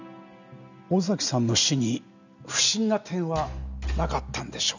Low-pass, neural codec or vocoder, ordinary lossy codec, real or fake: 7.2 kHz; none; none; real